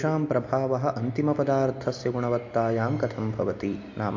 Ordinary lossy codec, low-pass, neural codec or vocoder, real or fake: MP3, 48 kbps; 7.2 kHz; none; real